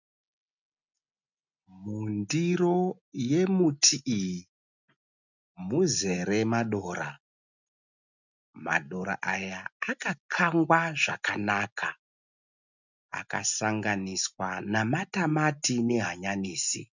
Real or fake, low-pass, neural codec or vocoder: real; 7.2 kHz; none